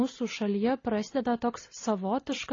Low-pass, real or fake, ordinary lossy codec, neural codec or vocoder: 7.2 kHz; real; AAC, 32 kbps; none